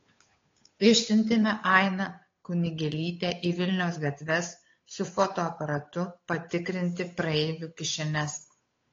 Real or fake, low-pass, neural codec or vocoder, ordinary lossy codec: fake; 7.2 kHz; codec, 16 kHz, 8 kbps, FunCodec, trained on Chinese and English, 25 frames a second; AAC, 32 kbps